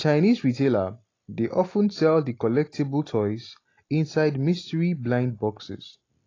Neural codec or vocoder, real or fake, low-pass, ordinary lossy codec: none; real; 7.2 kHz; AAC, 32 kbps